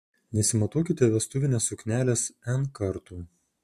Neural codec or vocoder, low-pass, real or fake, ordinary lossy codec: none; 14.4 kHz; real; MP3, 64 kbps